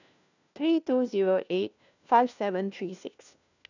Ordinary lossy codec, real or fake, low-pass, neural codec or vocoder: none; fake; 7.2 kHz; codec, 16 kHz, 1 kbps, FunCodec, trained on LibriTTS, 50 frames a second